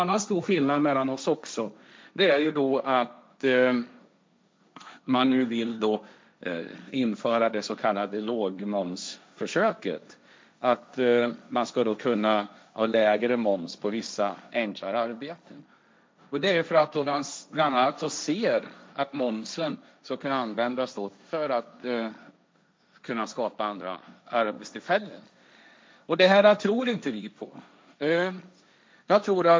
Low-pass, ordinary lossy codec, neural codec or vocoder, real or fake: none; none; codec, 16 kHz, 1.1 kbps, Voila-Tokenizer; fake